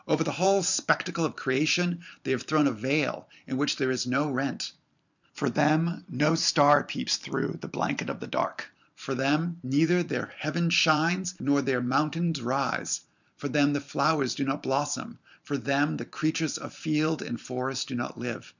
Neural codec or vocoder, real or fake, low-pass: vocoder, 44.1 kHz, 128 mel bands every 512 samples, BigVGAN v2; fake; 7.2 kHz